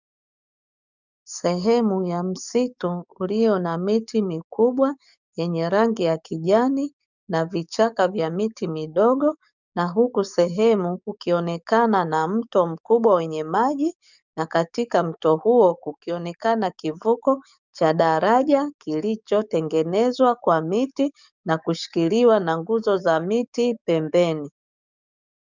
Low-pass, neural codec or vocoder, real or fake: 7.2 kHz; codec, 44.1 kHz, 7.8 kbps, DAC; fake